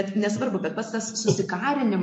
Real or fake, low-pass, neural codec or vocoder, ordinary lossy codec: real; 9.9 kHz; none; MP3, 48 kbps